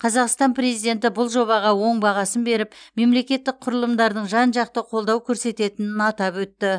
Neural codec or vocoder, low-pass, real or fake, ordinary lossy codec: none; 9.9 kHz; real; none